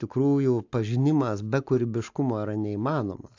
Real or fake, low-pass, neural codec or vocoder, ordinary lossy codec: real; 7.2 kHz; none; AAC, 48 kbps